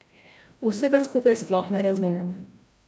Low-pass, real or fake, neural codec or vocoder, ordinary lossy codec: none; fake; codec, 16 kHz, 0.5 kbps, FreqCodec, larger model; none